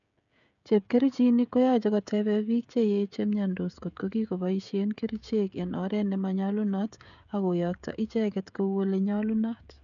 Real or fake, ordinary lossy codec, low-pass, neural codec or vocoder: fake; none; 7.2 kHz; codec, 16 kHz, 16 kbps, FreqCodec, smaller model